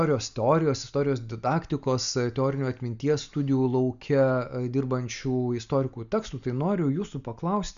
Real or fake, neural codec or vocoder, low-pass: real; none; 7.2 kHz